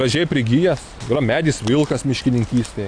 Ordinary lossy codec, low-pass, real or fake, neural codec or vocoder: AAC, 64 kbps; 9.9 kHz; real; none